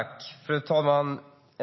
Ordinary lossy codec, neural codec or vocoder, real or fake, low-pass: MP3, 24 kbps; none; real; 7.2 kHz